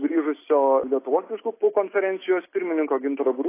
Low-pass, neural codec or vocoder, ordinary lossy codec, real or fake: 3.6 kHz; none; AAC, 24 kbps; real